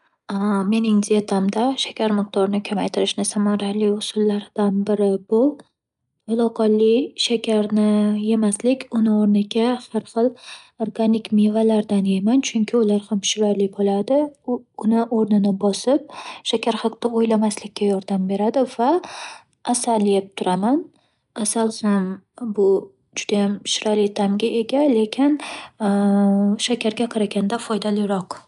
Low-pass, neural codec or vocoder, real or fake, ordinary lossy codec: 10.8 kHz; none; real; none